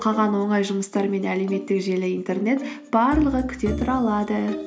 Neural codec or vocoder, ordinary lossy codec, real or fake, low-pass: none; none; real; none